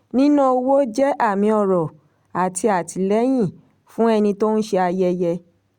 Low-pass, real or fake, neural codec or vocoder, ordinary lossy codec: 19.8 kHz; real; none; Opus, 64 kbps